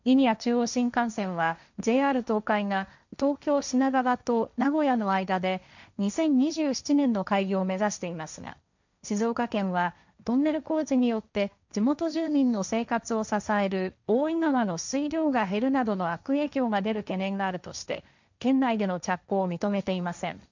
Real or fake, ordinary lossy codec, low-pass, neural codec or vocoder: fake; none; 7.2 kHz; codec, 16 kHz, 1.1 kbps, Voila-Tokenizer